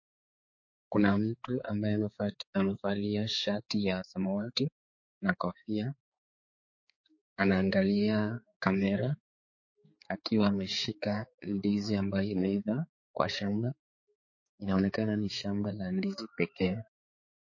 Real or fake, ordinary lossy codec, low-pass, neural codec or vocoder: fake; MP3, 32 kbps; 7.2 kHz; codec, 16 kHz, 4 kbps, X-Codec, HuBERT features, trained on balanced general audio